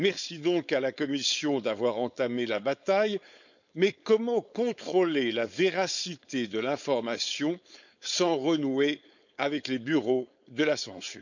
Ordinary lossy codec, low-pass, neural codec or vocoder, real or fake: none; 7.2 kHz; codec, 16 kHz, 4.8 kbps, FACodec; fake